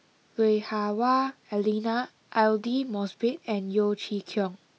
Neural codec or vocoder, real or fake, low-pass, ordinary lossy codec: none; real; none; none